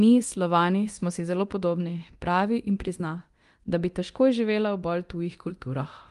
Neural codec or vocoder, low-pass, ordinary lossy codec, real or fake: codec, 24 kHz, 0.9 kbps, DualCodec; 10.8 kHz; Opus, 24 kbps; fake